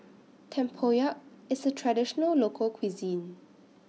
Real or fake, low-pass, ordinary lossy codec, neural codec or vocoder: real; none; none; none